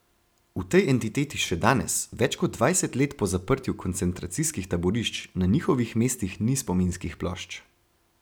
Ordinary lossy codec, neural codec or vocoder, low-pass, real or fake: none; vocoder, 44.1 kHz, 128 mel bands every 256 samples, BigVGAN v2; none; fake